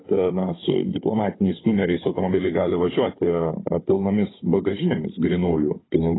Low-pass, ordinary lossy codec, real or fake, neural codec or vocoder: 7.2 kHz; AAC, 16 kbps; fake; codec, 16 kHz, 16 kbps, FunCodec, trained on Chinese and English, 50 frames a second